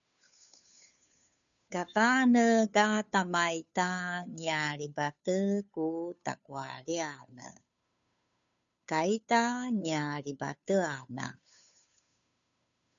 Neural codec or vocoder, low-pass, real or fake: codec, 16 kHz, 2 kbps, FunCodec, trained on Chinese and English, 25 frames a second; 7.2 kHz; fake